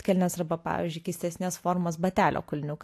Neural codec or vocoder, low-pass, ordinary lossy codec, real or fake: none; 14.4 kHz; AAC, 64 kbps; real